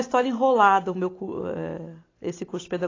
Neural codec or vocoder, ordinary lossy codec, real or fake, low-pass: none; AAC, 32 kbps; real; 7.2 kHz